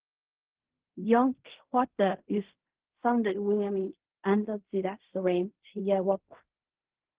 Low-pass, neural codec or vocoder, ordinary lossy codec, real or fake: 3.6 kHz; codec, 16 kHz in and 24 kHz out, 0.4 kbps, LongCat-Audio-Codec, fine tuned four codebook decoder; Opus, 16 kbps; fake